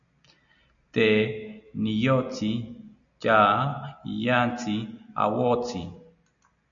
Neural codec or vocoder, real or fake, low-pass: none; real; 7.2 kHz